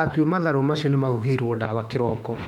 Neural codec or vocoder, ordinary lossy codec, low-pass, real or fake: autoencoder, 48 kHz, 32 numbers a frame, DAC-VAE, trained on Japanese speech; none; 19.8 kHz; fake